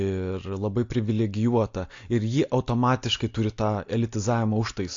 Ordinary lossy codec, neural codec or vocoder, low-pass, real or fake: AAC, 48 kbps; none; 7.2 kHz; real